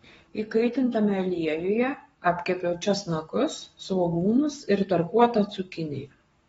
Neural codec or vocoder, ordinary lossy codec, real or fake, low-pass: codec, 44.1 kHz, 7.8 kbps, Pupu-Codec; AAC, 24 kbps; fake; 19.8 kHz